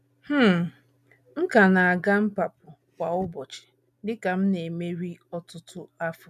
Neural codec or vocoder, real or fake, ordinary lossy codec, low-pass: none; real; none; 14.4 kHz